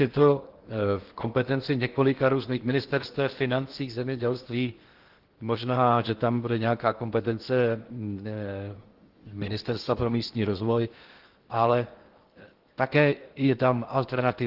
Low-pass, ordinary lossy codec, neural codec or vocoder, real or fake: 5.4 kHz; Opus, 16 kbps; codec, 16 kHz in and 24 kHz out, 0.8 kbps, FocalCodec, streaming, 65536 codes; fake